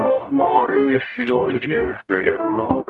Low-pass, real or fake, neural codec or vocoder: 10.8 kHz; fake; codec, 44.1 kHz, 0.9 kbps, DAC